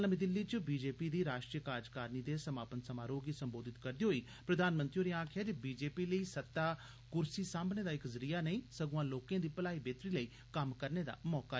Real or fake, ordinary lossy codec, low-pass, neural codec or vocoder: real; none; none; none